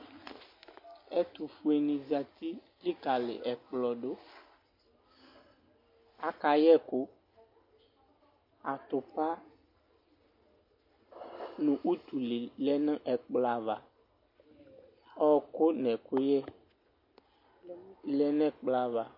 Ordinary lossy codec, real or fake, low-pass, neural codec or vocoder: MP3, 32 kbps; real; 5.4 kHz; none